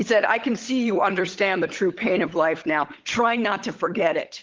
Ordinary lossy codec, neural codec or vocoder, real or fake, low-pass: Opus, 16 kbps; codec, 16 kHz, 16 kbps, FunCodec, trained on LibriTTS, 50 frames a second; fake; 7.2 kHz